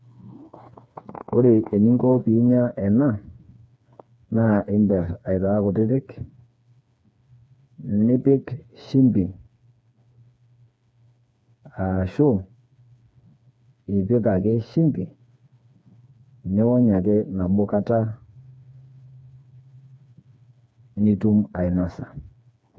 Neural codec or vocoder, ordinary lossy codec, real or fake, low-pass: codec, 16 kHz, 4 kbps, FreqCodec, smaller model; none; fake; none